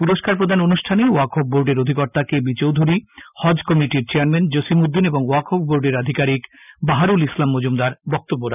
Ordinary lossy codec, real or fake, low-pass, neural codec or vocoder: none; real; 3.6 kHz; none